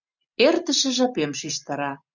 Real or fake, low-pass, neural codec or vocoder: real; 7.2 kHz; none